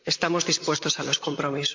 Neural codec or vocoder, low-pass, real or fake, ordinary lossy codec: vocoder, 44.1 kHz, 128 mel bands, Pupu-Vocoder; 7.2 kHz; fake; MP3, 48 kbps